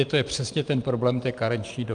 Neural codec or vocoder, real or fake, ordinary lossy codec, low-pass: none; real; Opus, 32 kbps; 9.9 kHz